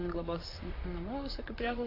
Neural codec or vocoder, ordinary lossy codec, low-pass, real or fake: vocoder, 44.1 kHz, 128 mel bands, Pupu-Vocoder; AAC, 24 kbps; 5.4 kHz; fake